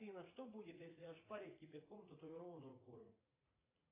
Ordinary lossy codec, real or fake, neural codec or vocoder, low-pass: AAC, 16 kbps; fake; vocoder, 44.1 kHz, 80 mel bands, Vocos; 3.6 kHz